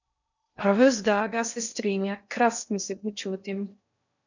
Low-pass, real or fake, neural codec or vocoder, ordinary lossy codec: 7.2 kHz; fake; codec, 16 kHz in and 24 kHz out, 0.6 kbps, FocalCodec, streaming, 2048 codes; none